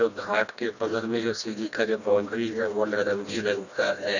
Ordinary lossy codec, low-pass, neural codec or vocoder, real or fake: none; 7.2 kHz; codec, 16 kHz, 1 kbps, FreqCodec, smaller model; fake